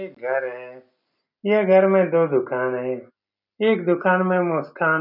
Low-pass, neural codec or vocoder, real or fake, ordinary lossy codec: 5.4 kHz; none; real; none